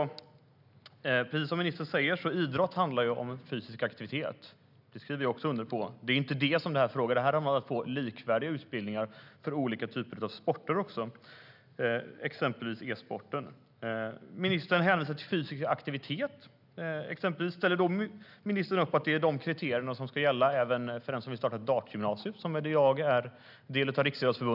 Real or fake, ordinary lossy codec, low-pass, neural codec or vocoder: real; none; 5.4 kHz; none